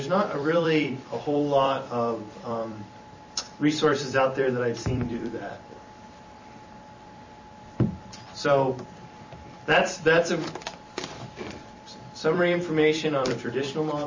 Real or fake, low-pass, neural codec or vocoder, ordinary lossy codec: fake; 7.2 kHz; vocoder, 44.1 kHz, 128 mel bands every 256 samples, BigVGAN v2; MP3, 32 kbps